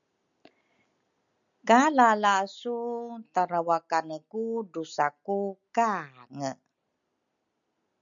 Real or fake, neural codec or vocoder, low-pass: real; none; 7.2 kHz